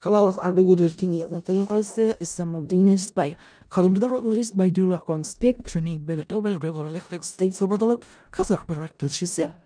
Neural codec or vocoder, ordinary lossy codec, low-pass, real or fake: codec, 16 kHz in and 24 kHz out, 0.4 kbps, LongCat-Audio-Codec, four codebook decoder; none; 9.9 kHz; fake